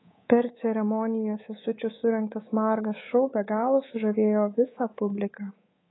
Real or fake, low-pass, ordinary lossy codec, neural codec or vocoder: fake; 7.2 kHz; AAC, 16 kbps; codec, 24 kHz, 3.1 kbps, DualCodec